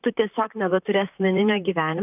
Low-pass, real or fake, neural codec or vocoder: 3.6 kHz; fake; vocoder, 44.1 kHz, 128 mel bands, Pupu-Vocoder